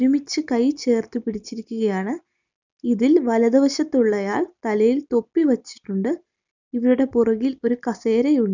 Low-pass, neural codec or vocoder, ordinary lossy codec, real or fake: 7.2 kHz; none; none; real